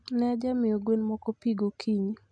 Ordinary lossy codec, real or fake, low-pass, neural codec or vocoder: MP3, 96 kbps; real; 9.9 kHz; none